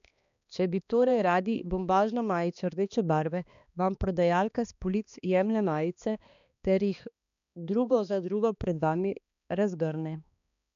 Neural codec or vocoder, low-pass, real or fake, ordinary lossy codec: codec, 16 kHz, 2 kbps, X-Codec, HuBERT features, trained on balanced general audio; 7.2 kHz; fake; none